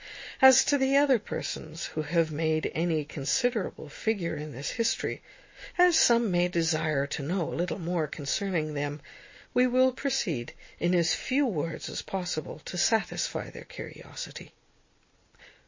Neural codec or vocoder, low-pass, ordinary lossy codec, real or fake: none; 7.2 kHz; MP3, 32 kbps; real